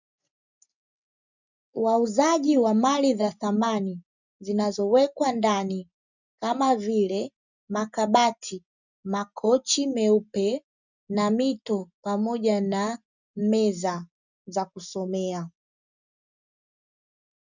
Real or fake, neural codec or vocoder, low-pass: real; none; 7.2 kHz